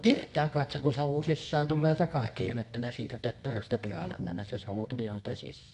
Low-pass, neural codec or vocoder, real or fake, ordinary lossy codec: 10.8 kHz; codec, 24 kHz, 0.9 kbps, WavTokenizer, medium music audio release; fake; none